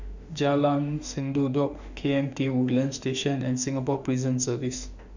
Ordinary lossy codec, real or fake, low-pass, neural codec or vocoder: none; fake; 7.2 kHz; autoencoder, 48 kHz, 32 numbers a frame, DAC-VAE, trained on Japanese speech